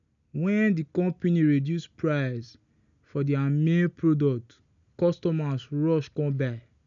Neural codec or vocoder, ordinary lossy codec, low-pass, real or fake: none; none; 7.2 kHz; real